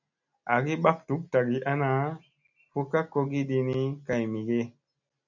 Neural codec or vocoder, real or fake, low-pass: none; real; 7.2 kHz